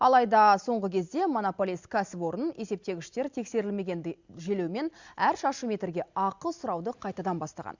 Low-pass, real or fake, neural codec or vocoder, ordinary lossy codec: 7.2 kHz; real; none; Opus, 64 kbps